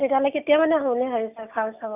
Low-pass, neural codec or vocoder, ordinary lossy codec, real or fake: 3.6 kHz; none; none; real